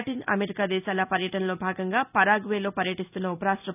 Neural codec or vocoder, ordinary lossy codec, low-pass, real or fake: none; none; 3.6 kHz; real